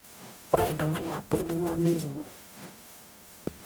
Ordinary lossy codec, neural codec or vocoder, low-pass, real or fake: none; codec, 44.1 kHz, 0.9 kbps, DAC; none; fake